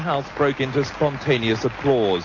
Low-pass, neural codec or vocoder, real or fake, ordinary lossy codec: 7.2 kHz; none; real; MP3, 32 kbps